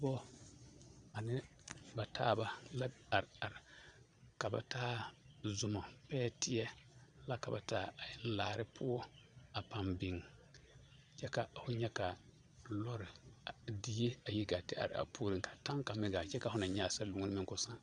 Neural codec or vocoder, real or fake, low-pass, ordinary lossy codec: vocoder, 22.05 kHz, 80 mel bands, Vocos; fake; 9.9 kHz; Opus, 64 kbps